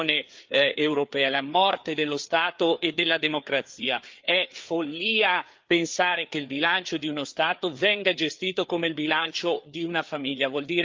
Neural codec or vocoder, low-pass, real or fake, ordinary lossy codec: codec, 16 kHz, 4 kbps, FreqCodec, larger model; 7.2 kHz; fake; Opus, 24 kbps